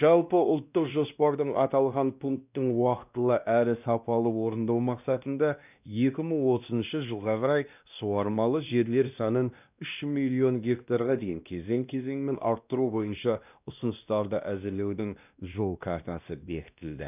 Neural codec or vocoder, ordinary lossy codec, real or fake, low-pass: codec, 16 kHz, 1 kbps, X-Codec, WavLM features, trained on Multilingual LibriSpeech; none; fake; 3.6 kHz